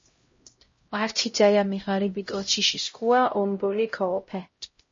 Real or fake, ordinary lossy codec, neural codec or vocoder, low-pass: fake; MP3, 32 kbps; codec, 16 kHz, 0.5 kbps, X-Codec, HuBERT features, trained on LibriSpeech; 7.2 kHz